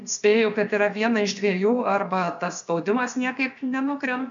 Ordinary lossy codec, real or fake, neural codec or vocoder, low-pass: MP3, 64 kbps; fake; codec, 16 kHz, about 1 kbps, DyCAST, with the encoder's durations; 7.2 kHz